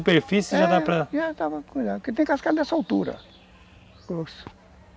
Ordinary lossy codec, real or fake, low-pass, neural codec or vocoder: none; real; none; none